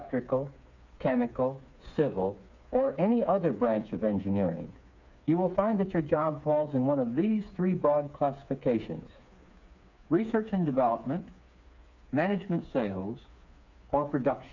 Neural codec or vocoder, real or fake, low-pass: codec, 16 kHz, 4 kbps, FreqCodec, smaller model; fake; 7.2 kHz